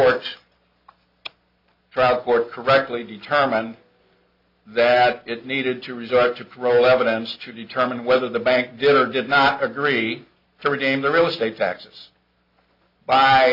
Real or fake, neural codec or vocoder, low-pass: real; none; 5.4 kHz